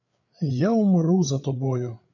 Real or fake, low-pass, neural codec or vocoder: fake; 7.2 kHz; codec, 16 kHz, 8 kbps, FreqCodec, larger model